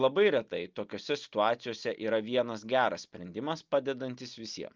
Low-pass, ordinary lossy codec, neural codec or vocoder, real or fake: 7.2 kHz; Opus, 24 kbps; none; real